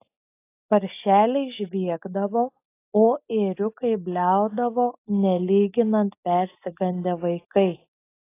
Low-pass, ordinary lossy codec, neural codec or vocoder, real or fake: 3.6 kHz; AAC, 24 kbps; none; real